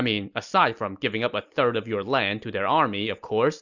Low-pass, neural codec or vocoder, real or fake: 7.2 kHz; none; real